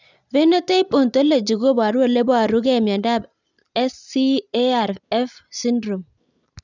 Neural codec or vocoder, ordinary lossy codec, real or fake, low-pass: none; none; real; 7.2 kHz